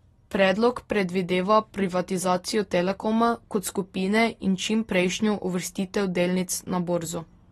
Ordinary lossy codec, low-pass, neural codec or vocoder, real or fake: AAC, 32 kbps; 14.4 kHz; none; real